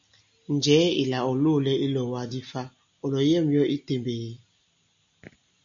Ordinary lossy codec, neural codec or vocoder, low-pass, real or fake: AAC, 64 kbps; none; 7.2 kHz; real